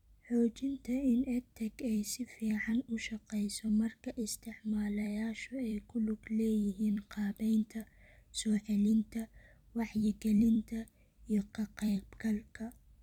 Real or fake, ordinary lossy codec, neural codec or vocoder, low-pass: fake; none; vocoder, 44.1 kHz, 128 mel bands every 256 samples, BigVGAN v2; 19.8 kHz